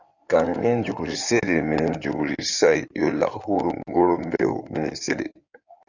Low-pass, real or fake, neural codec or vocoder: 7.2 kHz; fake; codec, 16 kHz in and 24 kHz out, 2.2 kbps, FireRedTTS-2 codec